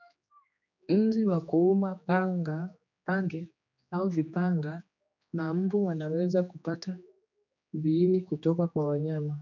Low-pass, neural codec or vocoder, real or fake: 7.2 kHz; codec, 16 kHz, 2 kbps, X-Codec, HuBERT features, trained on general audio; fake